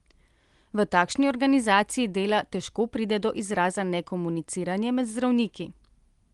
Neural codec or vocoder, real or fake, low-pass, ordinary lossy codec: none; real; 10.8 kHz; Opus, 24 kbps